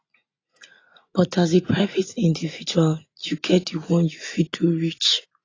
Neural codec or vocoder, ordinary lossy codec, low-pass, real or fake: none; AAC, 32 kbps; 7.2 kHz; real